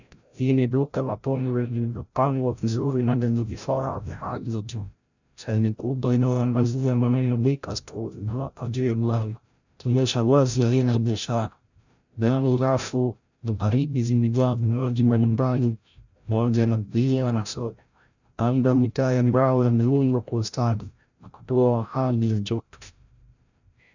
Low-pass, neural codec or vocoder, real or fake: 7.2 kHz; codec, 16 kHz, 0.5 kbps, FreqCodec, larger model; fake